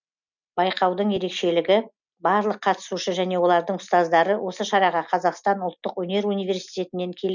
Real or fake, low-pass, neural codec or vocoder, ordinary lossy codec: real; 7.2 kHz; none; none